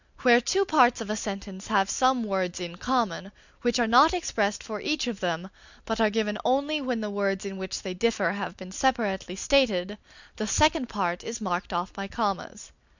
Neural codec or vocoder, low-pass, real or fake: none; 7.2 kHz; real